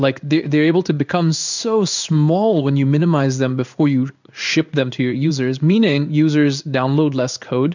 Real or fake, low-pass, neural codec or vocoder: fake; 7.2 kHz; codec, 16 kHz in and 24 kHz out, 1 kbps, XY-Tokenizer